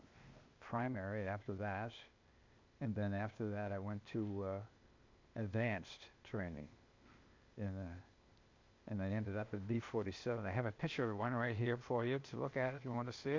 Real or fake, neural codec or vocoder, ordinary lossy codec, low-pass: fake; codec, 16 kHz, 0.8 kbps, ZipCodec; MP3, 48 kbps; 7.2 kHz